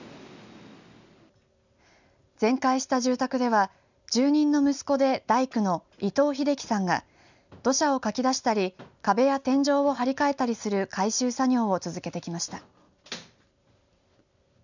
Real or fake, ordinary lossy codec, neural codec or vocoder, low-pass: real; none; none; 7.2 kHz